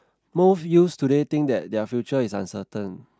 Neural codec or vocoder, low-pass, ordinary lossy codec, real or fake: none; none; none; real